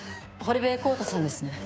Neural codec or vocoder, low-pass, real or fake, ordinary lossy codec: codec, 16 kHz, 6 kbps, DAC; none; fake; none